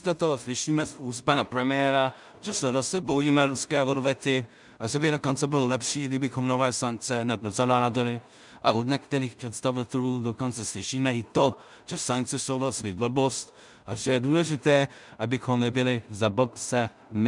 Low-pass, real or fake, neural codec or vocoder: 10.8 kHz; fake; codec, 16 kHz in and 24 kHz out, 0.4 kbps, LongCat-Audio-Codec, two codebook decoder